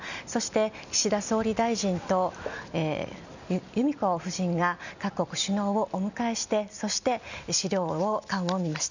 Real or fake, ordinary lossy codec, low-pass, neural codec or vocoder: real; none; 7.2 kHz; none